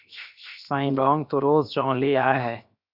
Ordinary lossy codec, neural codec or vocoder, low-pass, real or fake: Opus, 64 kbps; codec, 16 kHz, 0.7 kbps, FocalCodec; 5.4 kHz; fake